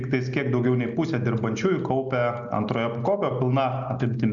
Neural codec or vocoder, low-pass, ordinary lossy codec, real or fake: none; 7.2 kHz; MP3, 64 kbps; real